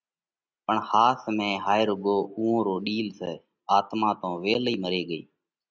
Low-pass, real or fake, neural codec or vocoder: 7.2 kHz; real; none